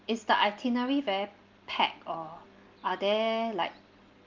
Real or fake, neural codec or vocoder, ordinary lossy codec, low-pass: real; none; Opus, 24 kbps; 7.2 kHz